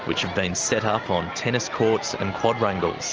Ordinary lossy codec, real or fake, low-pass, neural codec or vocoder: Opus, 24 kbps; real; 7.2 kHz; none